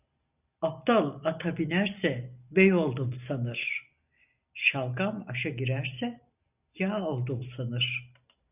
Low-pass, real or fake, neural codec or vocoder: 3.6 kHz; real; none